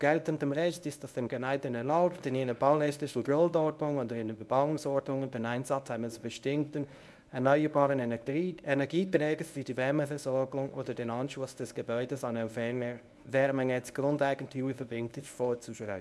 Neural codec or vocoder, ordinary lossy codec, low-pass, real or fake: codec, 24 kHz, 0.9 kbps, WavTokenizer, medium speech release version 1; none; none; fake